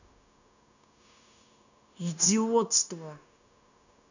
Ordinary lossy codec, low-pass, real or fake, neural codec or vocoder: none; 7.2 kHz; fake; codec, 16 kHz, 0.9 kbps, LongCat-Audio-Codec